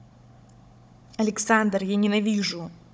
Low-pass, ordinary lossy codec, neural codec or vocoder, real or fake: none; none; codec, 16 kHz, 16 kbps, FunCodec, trained on Chinese and English, 50 frames a second; fake